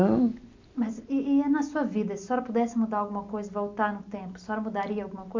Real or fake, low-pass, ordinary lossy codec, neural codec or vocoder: real; 7.2 kHz; MP3, 48 kbps; none